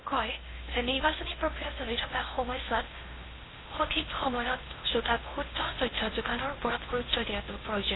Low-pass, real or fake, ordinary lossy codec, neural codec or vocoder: 7.2 kHz; fake; AAC, 16 kbps; codec, 16 kHz in and 24 kHz out, 0.6 kbps, FocalCodec, streaming, 2048 codes